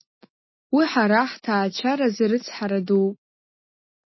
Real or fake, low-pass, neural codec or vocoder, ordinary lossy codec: real; 7.2 kHz; none; MP3, 24 kbps